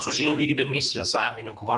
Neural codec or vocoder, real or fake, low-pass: codec, 24 kHz, 1.5 kbps, HILCodec; fake; 10.8 kHz